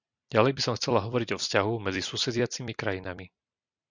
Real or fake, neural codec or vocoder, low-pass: fake; vocoder, 44.1 kHz, 128 mel bands every 256 samples, BigVGAN v2; 7.2 kHz